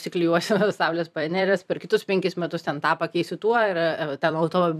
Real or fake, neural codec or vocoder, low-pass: fake; vocoder, 44.1 kHz, 128 mel bands every 256 samples, BigVGAN v2; 14.4 kHz